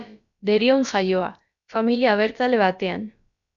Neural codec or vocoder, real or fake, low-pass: codec, 16 kHz, about 1 kbps, DyCAST, with the encoder's durations; fake; 7.2 kHz